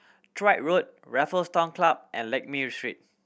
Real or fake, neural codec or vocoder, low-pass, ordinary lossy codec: real; none; none; none